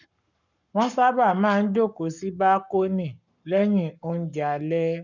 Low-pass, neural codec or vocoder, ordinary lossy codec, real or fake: 7.2 kHz; codec, 44.1 kHz, 7.8 kbps, Pupu-Codec; none; fake